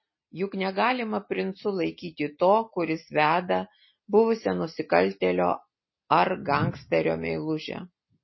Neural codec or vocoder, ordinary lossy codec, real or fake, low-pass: none; MP3, 24 kbps; real; 7.2 kHz